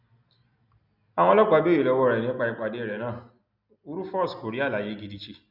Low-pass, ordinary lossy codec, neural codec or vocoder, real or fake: 5.4 kHz; none; none; real